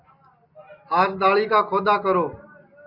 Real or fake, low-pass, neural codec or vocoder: real; 5.4 kHz; none